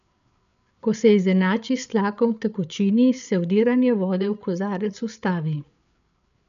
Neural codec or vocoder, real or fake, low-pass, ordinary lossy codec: codec, 16 kHz, 8 kbps, FreqCodec, larger model; fake; 7.2 kHz; none